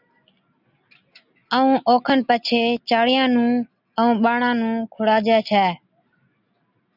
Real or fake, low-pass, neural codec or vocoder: real; 5.4 kHz; none